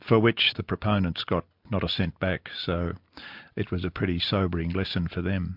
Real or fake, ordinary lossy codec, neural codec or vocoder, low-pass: real; MP3, 48 kbps; none; 5.4 kHz